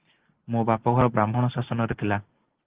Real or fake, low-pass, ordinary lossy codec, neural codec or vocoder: real; 3.6 kHz; Opus, 16 kbps; none